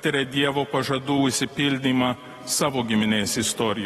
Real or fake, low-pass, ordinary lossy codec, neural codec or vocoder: real; 19.8 kHz; AAC, 32 kbps; none